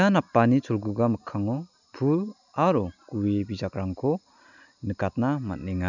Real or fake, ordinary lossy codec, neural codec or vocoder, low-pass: real; none; none; 7.2 kHz